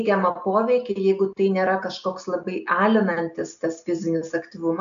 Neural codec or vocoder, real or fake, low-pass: none; real; 7.2 kHz